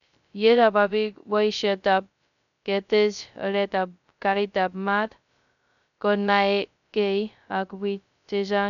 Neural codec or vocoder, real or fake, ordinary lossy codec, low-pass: codec, 16 kHz, 0.2 kbps, FocalCodec; fake; none; 7.2 kHz